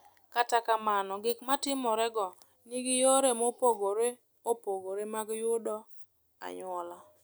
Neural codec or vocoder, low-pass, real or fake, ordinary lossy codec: none; none; real; none